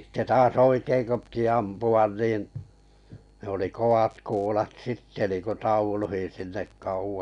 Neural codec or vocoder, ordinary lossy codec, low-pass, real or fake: none; none; 10.8 kHz; real